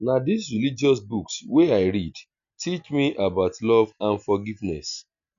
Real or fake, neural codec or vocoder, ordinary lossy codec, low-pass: real; none; none; 7.2 kHz